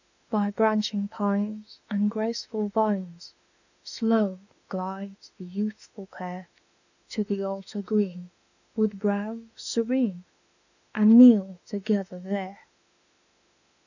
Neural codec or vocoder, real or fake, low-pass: autoencoder, 48 kHz, 32 numbers a frame, DAC-VAE, trained on Japanese speech; fake; 7.2 kHz